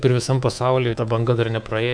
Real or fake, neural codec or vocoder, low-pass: fake; codec, 24 kHz, 3.1 kbps, DualCodec; 9.9 kHz